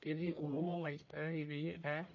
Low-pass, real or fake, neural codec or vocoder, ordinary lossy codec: 7.2 kHz; fake; codec, 44.1 kHz, 1.7 kbps, Pupu-Codec; MP3, 48 kbps